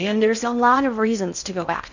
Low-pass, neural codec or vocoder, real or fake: 7.2 kHz; codec, 16 kHz in and 24 kHz out, 0.6 kbps, FocalCodec, streaming, 2048 codes; fake